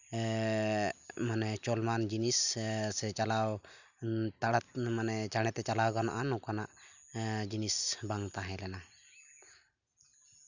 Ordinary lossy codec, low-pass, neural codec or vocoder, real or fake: none; 7.2 kHz; none; real